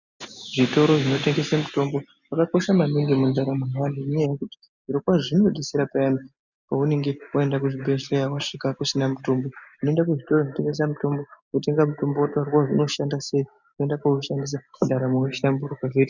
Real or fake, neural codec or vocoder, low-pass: real; none; 7.2 kHz